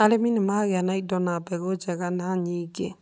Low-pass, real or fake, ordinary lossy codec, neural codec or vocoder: none; real; none; none